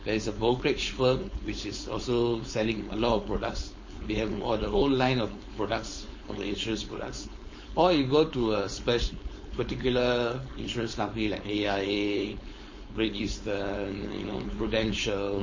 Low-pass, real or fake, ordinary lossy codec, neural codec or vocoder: 7.2 kHz; fake; MP3, 32 kbps; codec, 16 kHz, 4.8 kbps, FACodec